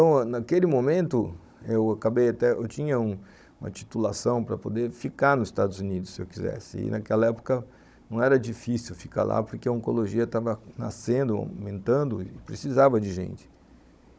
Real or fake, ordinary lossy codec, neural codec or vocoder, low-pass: fake; none; codec, 16 kHz, 16 kbps, FunCodec, trained on Chinese and English, 50 frames a second; none